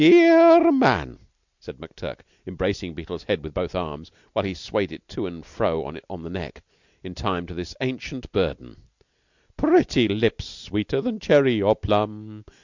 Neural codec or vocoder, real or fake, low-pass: none; real; 7.2 kHz